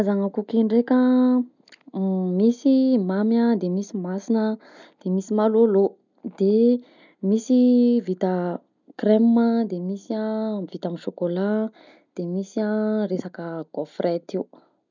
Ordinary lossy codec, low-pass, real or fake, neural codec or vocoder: none; 7.2 kHz; real; none